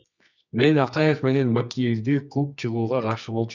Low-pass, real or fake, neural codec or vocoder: 7.2 kHz; fake; codec, 24 kHz, 0.9 kbps, WavTokenizer, medium music audio release